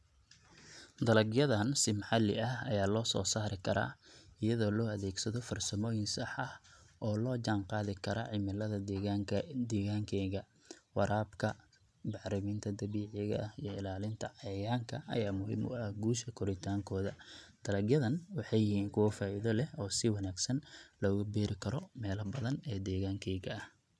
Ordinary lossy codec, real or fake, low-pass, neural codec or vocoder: none; real; none; none